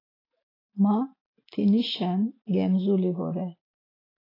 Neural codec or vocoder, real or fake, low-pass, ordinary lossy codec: none; real; 5.4 kHz; AAC, 24 kbps